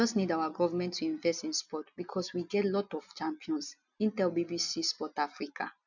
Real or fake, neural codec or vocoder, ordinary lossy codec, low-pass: real; none; none; 7.2 kHz